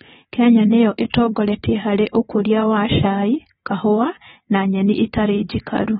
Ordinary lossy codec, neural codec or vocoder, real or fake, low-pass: AAC, 16 kbps; vocoder, 24 kHz, 100 mel bands, Vocos; fake; 10.8 kHz